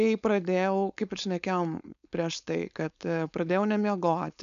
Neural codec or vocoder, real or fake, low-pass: codec, 16 kHz, 4.8 kbps, FACodec; fake; 7.2 kHz